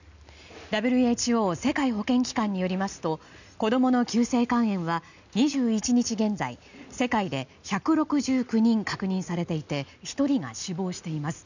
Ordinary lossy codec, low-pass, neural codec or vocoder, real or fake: none; 7.2 kHz; none; real